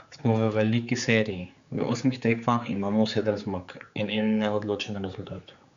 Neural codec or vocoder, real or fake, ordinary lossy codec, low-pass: codec, 16 kHz, 4 kbps, X-Codec, HuBERT features, trained on general audio; fake; Opus, 64 kbps; 7.2 kHz